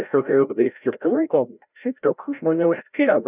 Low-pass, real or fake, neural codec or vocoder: 3.6 kHz; fake; codec, 16 kHz, 0.5 kbps, FreqCodec, larger model